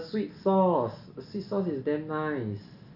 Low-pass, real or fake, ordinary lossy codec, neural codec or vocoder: 5.4 kHz; real; none; none